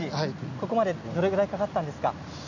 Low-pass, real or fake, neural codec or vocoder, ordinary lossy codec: 7.2 kHz; real; none; none